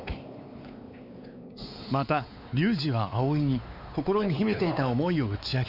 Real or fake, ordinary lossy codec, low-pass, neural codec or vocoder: fake; none; 5.4 kHz; codec, 16 kHz, 4 kbps, X-Codec, WavLM features, trained on Multilingual LibriSpeech